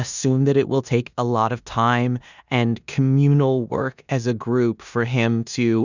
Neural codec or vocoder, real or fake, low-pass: codec, 16 kHz in and 24 kHz out, 0.9 kbps, LongCat-Audio-Codec, four codebook decoder; fake; 7.2 kHz